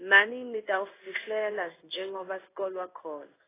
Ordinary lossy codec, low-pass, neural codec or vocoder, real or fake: AAC, 16 kbps; 3.6 kHz; codec, 16 kHz in and 24 kHz out, 1 kbps, XY-Tokenizer; fake